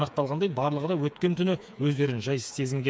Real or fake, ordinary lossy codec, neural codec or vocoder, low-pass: fake; none; codec, 16 kHz, 4 kbps, FreqCodec, smaller model; none